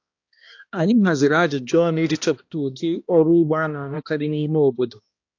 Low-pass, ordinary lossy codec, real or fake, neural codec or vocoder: 7.2 kHz; AAC, 48 kbps; fake; codec, 16 kHz, 1 kbps, X-Codec, HuBERT features, trained on balanced general audio